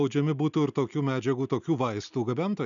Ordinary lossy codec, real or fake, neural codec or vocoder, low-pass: MP3, 96 kbps; real; none; 7.2 kHz